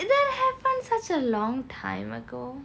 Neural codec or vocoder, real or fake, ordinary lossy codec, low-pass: none; real; none; none